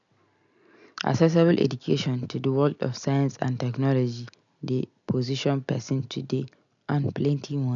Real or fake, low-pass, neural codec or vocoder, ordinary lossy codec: real; 7.2 kHz; none; MP3, 96 kbps